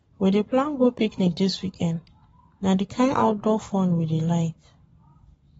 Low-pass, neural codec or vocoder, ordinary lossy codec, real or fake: 19.8 kHz; codec, 44.1 kHz, 7.8 kbps, Pupu-Codec; AAC, 24 kbps; fake